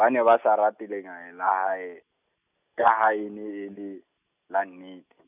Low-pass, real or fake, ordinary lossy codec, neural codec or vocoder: 3.6 kHz; real; none; none